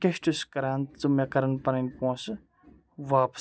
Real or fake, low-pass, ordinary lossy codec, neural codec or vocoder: real; none; none; none